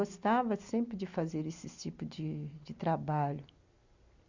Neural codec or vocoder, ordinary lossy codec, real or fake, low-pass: none; Opus, 64 kbps; real; 7.2 kHz